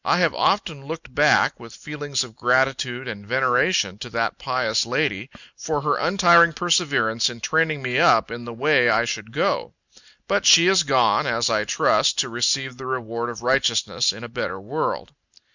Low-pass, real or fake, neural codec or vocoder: 7.2 kHz; real; none